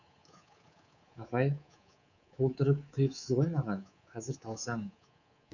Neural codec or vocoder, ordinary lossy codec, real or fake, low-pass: codec, 24 kHz, 3.1 kbps, DualCodec; none; fake; 7.2 kHz